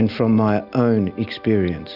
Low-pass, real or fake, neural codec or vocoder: 5.4 kHz; real; none